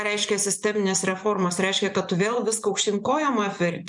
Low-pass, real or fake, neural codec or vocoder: 10.8 kHz; fake; vocoder, 24 kHz, 100 mel bands, Vocos